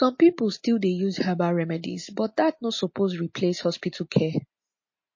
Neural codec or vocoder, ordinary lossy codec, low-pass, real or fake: none; MP3, 32 kbps; 7.2 kHz; real